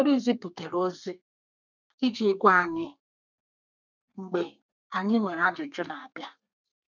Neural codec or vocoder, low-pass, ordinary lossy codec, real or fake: codec, 32 kHz, 1.9 kbps, SNAC; 7.2 kHz; none; fake